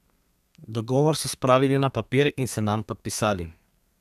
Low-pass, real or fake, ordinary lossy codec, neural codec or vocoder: 14.4 kHz; fake; none; codec, 32 kHz, 1.9 kbps, SNAC